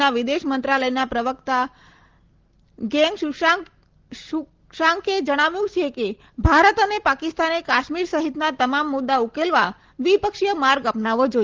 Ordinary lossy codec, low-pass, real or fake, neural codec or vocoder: Opus, 16 kbps; 7.2 kHz; real; none